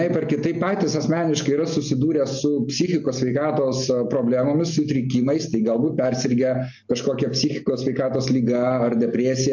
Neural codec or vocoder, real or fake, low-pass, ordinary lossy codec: none; real; 7.2 kHz; MP3, 48 kbps